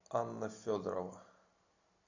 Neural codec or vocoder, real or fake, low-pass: none; real; 7.2 kHz